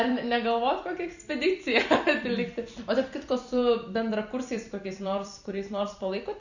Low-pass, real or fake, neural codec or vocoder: 7.2 kHz; fake; vocoder, 44.1 kHz, 128 mel bands every 256 samples, BigVGAN v2